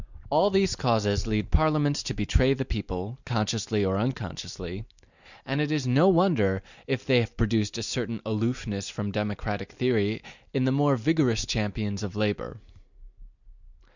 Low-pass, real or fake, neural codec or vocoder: 7.2 kHz; real; none